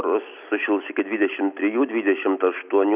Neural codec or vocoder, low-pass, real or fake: none; 3.6 kHz; real